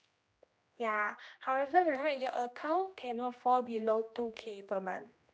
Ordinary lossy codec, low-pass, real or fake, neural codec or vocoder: none; none; fake; codec, 16 kHz, 1 kbps, X-Codec, HuBERT features, trained on general audio